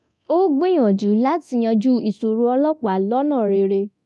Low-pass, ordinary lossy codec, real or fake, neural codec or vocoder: none; none; fake; codec, 24 kHz, 0.9 kbps, DualCodec